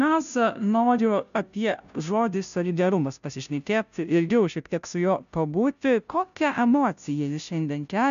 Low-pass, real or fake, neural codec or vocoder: 7.2 kHz; fake; codec, 16 kHz, 0.5 kbps, FunCodec, trained on Chinese and English, 25 frames a second